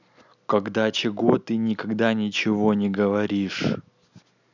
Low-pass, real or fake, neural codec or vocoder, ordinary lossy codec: 7.2 kHz; real; none; none